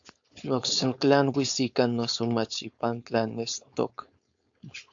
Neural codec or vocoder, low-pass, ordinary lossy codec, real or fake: codec, 16 kHz, 4.8 kbps, FACodec; 7.2 kHz; AAC, 64 kbps; fake